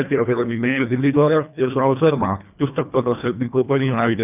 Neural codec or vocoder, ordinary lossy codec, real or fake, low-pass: codec, 24 kHz, 1.5 kbps, HILCodec; none; fake; 3.6 kHz